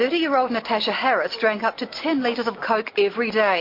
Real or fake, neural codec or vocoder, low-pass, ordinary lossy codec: real; none; 5.4 kHz; MP3, 32 kbps